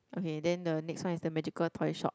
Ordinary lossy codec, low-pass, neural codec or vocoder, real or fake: none; none; none; real